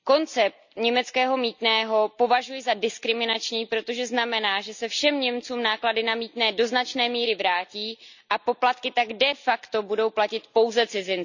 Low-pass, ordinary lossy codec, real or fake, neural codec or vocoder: 7.2 kHz; none; real; none